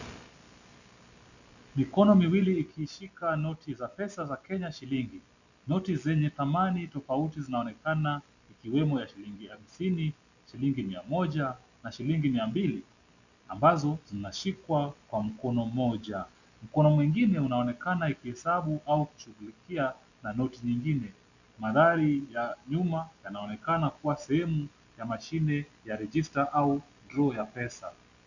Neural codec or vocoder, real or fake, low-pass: none; real; 7.2 kHz